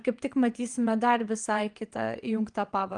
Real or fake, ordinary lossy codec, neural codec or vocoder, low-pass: fake; Opus, 24 kbps; vocoder, 22.05 kHz, 80 mel bands, WaveNeXt; 9.9 kHz